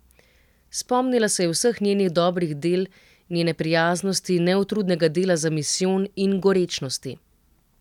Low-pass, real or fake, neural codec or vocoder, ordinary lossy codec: 19.8 kHz; real; none; none